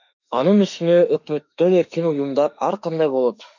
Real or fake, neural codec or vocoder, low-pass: fake; autoencoder, 48 kHz, 32 numbers a frame, DAC-VAE, trained on Japanese speech; 7.2 kHz